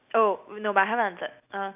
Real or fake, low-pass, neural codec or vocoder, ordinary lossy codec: real; 3.6 kHz; none; none